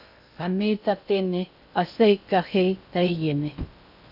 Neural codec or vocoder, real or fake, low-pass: codec, 16 kHz in and 24 kHz out, 0.6 kbps, FocalCodec, streaming, 2048 codes; fake; 5.4 kHz